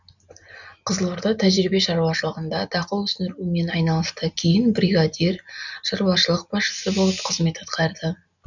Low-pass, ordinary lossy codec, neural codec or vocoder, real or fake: 7.2 kHz; none; none; real